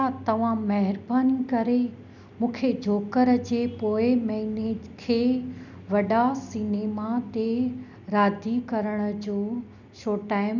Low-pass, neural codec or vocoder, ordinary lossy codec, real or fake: 7.2 kHz; none; none; real